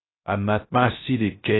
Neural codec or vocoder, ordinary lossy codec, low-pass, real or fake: codec, 16 kHz, 0.3 kbps, FocalCodec; AAC, 16 kbps; 7.2 kHz; fake